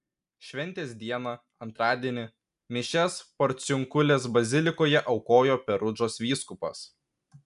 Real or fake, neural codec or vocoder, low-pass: real; none; 10.8 kHz